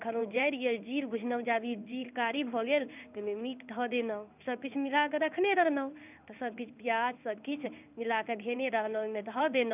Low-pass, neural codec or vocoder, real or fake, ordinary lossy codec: 3.6 kHz; codec, 16 kHz in and 24 kHz out, 1 kbps, XY-Tokenizer; fake; none